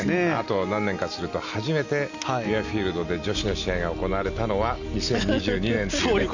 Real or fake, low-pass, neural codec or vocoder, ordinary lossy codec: real; 7.2 kHz; none; none